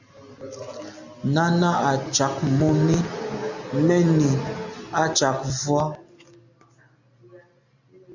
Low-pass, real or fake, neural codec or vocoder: 7.2 kHz; real; none